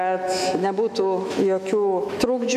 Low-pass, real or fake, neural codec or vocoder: 14.4 kHz; fake; autoencoder, 48 kHz, 128 numbers a frame, DAC-VAE, trained on Japanese speech